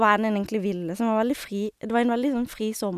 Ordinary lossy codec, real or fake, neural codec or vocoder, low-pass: none; real; none; 14.4 kHz